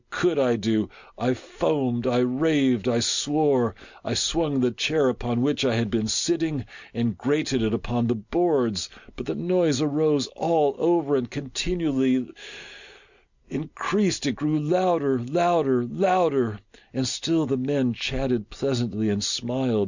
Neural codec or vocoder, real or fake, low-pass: none; real; 7.2 kHz